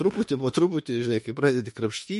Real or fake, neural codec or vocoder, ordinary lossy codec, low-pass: fake; autoencoder, 48 kHz, 32 numbers a frame, DAC-VAE, trained on Japanese speech; MP3, 48 kbps; 14.4 kHz